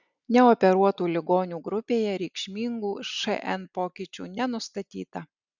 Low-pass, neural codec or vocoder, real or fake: 7.2 kHz; none; real